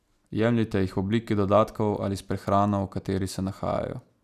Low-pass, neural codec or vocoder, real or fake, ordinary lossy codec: 14.4 kHz; none; real; none